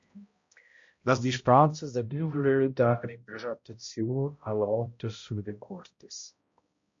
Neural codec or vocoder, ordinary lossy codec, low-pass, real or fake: codec, 16 kHz, 0.5 kbps, X-Codec, HuBERT features, trained on balanced general audio; MP3, 48 kbps; 7.2 kHz; fake